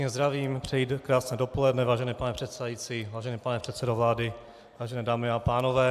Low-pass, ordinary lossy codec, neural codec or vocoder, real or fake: 14.4 kHz; AAC, 96 kbps; none; real